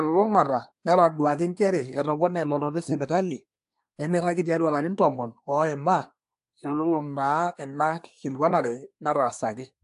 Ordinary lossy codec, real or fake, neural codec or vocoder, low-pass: AAC, 64 kbps; fake; codec, 24 kHz, 1 kbps, SNAC; 10.8 kHz